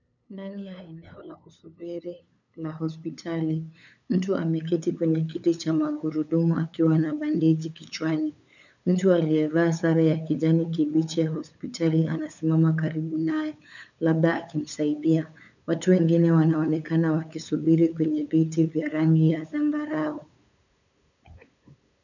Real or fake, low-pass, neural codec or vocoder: fake; 7.2 kHz; codec, 16 kHz, 8 kbps, FunCodec, trained on LibriTTS, 25 frames a second